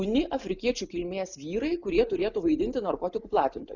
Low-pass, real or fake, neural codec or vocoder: 7.2 kHz; real; none